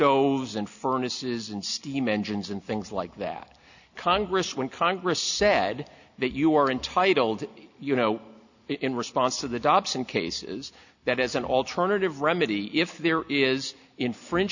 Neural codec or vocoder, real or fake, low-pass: none; real; 7.2 kHz